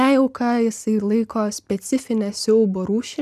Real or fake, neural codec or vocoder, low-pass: real; none; 14.4 kHz